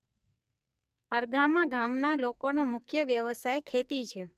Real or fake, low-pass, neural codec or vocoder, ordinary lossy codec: fake; 14.4 kHz; codec, 44.1 kHz, 2.6 kbps, SNAC; none